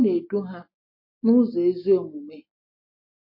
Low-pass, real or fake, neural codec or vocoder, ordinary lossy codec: 5.4 kHz; real; none; MP3, 32 kbps